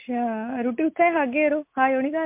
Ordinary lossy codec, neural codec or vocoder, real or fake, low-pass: MP3, 32 kbps; none; real; 3.6 kHz